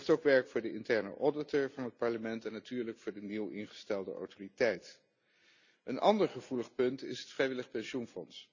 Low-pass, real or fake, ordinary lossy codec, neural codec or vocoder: 7.2 kHz; real; none; none